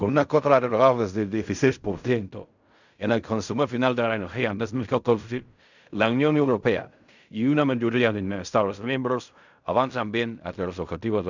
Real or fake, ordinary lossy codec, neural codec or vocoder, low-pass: fake; none; codec, 16 kHz in and 24 kHz out, 0.4 kbps, LongCat-Audio-Codec, fine tuned four codebook decoder; 7.2 kHz